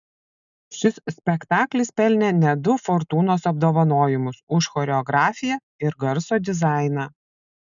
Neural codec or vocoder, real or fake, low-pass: none; real; 7.2 kHz